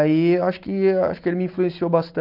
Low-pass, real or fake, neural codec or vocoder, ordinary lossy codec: 5.4 kHz; real; none; Opus, 24 kbps